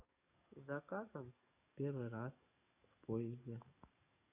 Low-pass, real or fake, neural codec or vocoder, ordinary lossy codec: 3.6 kHz; fake; codec, 44.1 kHz, 7.8 kbps, DAC; none